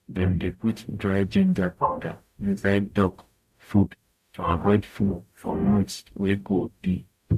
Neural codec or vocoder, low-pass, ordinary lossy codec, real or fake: codec, 44.1 kHz, 0.9 kbps, DAC; 14.4 kHz; none; fake